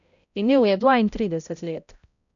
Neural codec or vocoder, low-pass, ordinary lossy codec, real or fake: codec, 16 kHz, 0.5 kbps, X-Codec, HuBERT features, trained on balanced general audio; 7.2 kHz; none; fake